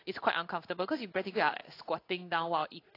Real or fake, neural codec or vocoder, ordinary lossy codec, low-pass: fake; codec, 16 kHz in and 24 kHz out, 1 kbps, XY-Tokenizer; AAC, 32 kbps; 5.4 kHz